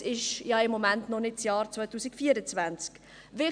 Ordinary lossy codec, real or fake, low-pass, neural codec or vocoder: none; real; 9.9 kHz; none